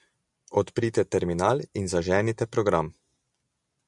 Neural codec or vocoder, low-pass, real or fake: none; 10.8 kHz; real